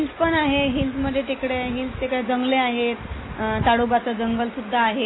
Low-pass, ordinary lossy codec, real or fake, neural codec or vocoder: 7.2 kHz; AAC, 16 kbps; real; none